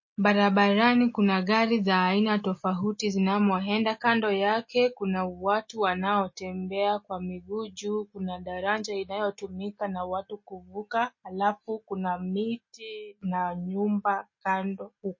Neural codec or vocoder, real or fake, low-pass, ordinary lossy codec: none; real; 7.2 kHz; MP3, 32 kbps